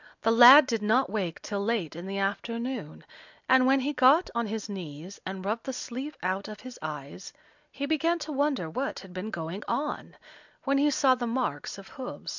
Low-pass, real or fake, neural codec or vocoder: 7.2 kHz; real; none